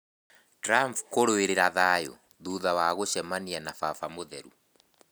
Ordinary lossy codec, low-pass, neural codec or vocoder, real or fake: none; none; none; real